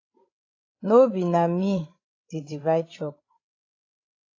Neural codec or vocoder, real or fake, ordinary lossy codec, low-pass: codec, 16 kHz, 16 kbps, FreqCodec, larger model; fake; AAC, 32 kbps; 7.2 kHz